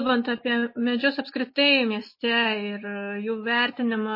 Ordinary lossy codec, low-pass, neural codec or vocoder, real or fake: MP3, 24 kbps; 5.4 kHz; none; real